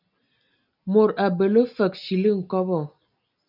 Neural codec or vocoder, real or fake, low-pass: none; real; 5.4 kHz